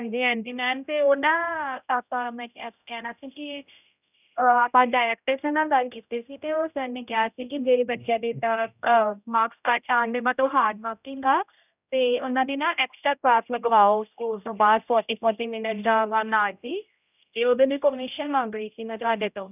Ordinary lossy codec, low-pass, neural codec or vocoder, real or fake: none; 3.6 kHz; codec, 16 kHz, 0.5 kbps, X-Codec, HuBERT features, trained on general audio; fake